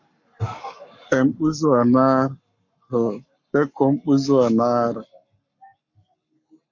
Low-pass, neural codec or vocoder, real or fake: 7.2 kHz; codec, 44.1 kHz, 7.8 kbps, Pupu-Codec; fake